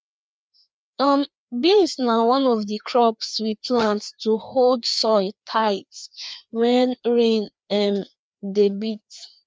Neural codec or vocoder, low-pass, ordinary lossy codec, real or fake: codec, 16 kHz, 2 kbps, FreqCodec, larger model; none; none; fake